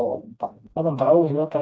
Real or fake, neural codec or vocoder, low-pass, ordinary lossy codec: fake; codec, 16 kHz, 1 kbps, FreqCodec, smaller model; none; none